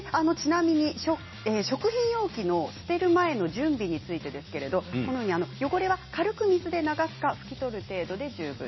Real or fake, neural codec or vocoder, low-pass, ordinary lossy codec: real; none; 7.2 kHz; MP3, 24 kbps